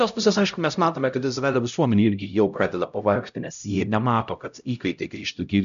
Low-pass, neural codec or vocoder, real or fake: 7.2 kHz; codec, 16 kHz, 0.5 kbps, X-Codec, HuBERT features, trained on LibriSpeech; fake